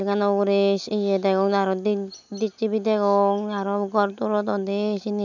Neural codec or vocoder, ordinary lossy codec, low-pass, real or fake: none; none; 7.2 kHz; real